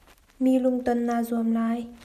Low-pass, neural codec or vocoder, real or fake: 14.4 kHz; none; real